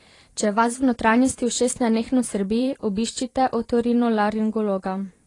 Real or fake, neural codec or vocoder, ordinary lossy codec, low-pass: fake; vocoder, 44.1 kHz, 128 mel bands every 512 samples, BigVGAN v2; AAC, 32 kbps; 10.8 kHz